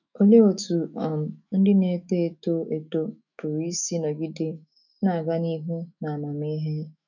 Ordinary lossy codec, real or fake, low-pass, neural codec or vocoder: none; fake; 7.2 kHz; autoencoder, 48 kHz, 128 numbers a frame, DAC-VAE, trained on Japanese speech